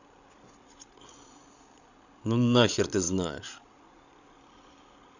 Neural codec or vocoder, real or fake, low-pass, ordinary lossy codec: codec, 16 kHz, 16 kbps, FreqCodec, larger model; fake; 7.2 kHz; none